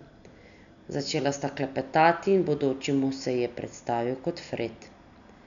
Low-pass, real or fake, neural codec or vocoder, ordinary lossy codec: 7.2 kHz; real; none; none